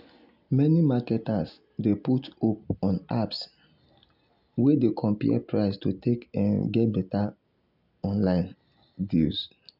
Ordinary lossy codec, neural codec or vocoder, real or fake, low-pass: none; none; real; 5.4 kHz